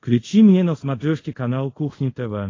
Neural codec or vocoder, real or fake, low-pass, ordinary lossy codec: codec, 24 kHz, 0.5 kbps, DualCodec; fake; 7.2 kHz; AAC, 32 kbps